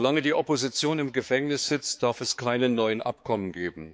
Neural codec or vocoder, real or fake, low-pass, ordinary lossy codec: codec, 16 kHz, 4 kbps, X-Codec, HuBERT features, trained on balanced general audio; fake; none; none